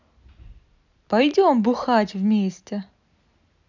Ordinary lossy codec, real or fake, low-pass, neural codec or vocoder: none; real; 7.2 kHz; none